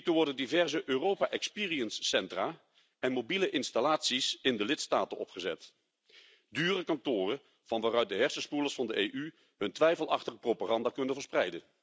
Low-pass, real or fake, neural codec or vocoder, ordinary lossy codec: none; real; none; none